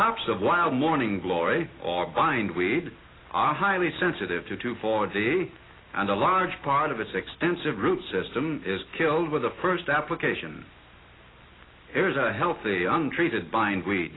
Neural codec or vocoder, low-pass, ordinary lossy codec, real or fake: none; 7.2 kHz; AAC, 16 kbps; real